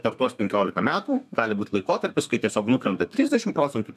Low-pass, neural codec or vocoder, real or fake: 14.4 kHz; codec, 32 kHz, 1.9 kbps, SNAC; fake